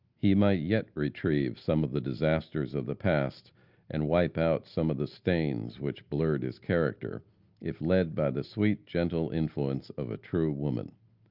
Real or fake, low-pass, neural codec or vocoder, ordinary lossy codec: real; 5.4 kHz; none; Opus, 32 kbps